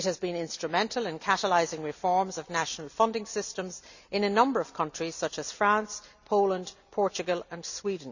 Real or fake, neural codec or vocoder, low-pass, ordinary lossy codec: real; none; 7.2 kHz; none